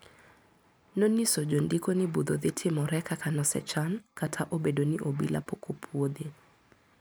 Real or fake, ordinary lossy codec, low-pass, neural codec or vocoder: real; none; none; none